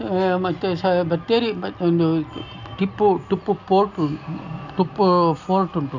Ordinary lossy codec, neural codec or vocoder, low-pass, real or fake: none; none; 7.2 kHz; real